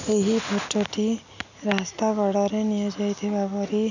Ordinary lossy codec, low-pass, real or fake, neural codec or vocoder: none; 7.2 kHz; real; none